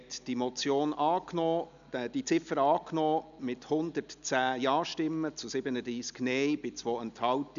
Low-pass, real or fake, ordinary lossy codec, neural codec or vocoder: 7.2 kHz; real; none; none